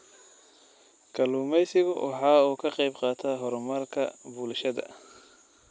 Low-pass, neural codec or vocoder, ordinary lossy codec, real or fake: none; none; none; real